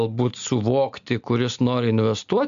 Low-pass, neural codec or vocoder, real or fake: 7.2 kHz; none; real